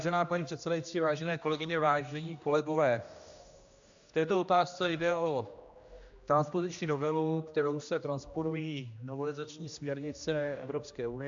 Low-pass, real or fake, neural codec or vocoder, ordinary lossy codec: 7.2 kHz; fake; codec, 16 kHz, 1 kbps, X-Codec, HuBERT features, trained on general audio; AAC, 64 kbps